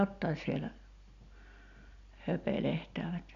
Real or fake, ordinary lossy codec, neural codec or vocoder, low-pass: real; none; none; 7.2 kHz